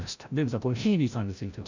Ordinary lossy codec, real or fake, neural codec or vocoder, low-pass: none; fake; codec, 16 kHz, 0.5 kbps, FreqCodec, larger model; 7.2 kHz